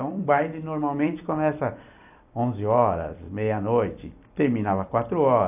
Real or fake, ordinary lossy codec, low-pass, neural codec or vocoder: fake; AAC, 32 kbps; 3.6 kHz; vocoder, 44.1 kHz, 128 mel bands every 256 samples, BigVGAN v2